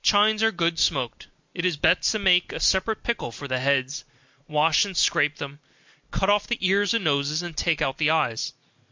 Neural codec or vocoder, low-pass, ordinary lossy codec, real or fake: none; 7.2 kHz; MP3, 64 kbps; real